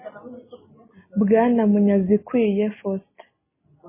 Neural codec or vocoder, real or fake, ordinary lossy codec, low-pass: none; real; MP3, 16 kbps; 3.6 kHz